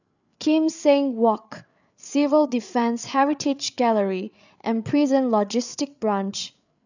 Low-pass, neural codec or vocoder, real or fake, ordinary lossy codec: 7.2 kHz; codec, 16 kHz, 8 kbps, FreqCodec, larger model; fake; none